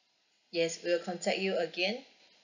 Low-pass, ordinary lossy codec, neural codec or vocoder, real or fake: 7.2 kHz; none; none; real